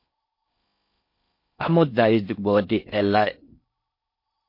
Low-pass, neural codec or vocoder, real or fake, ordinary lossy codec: 5.4 kHz; codec, 16 kHz in and 24 kHz out, 0.6 kbps, FocalCodec, streaming, 4096 codes; fake; MP3, 32 kbps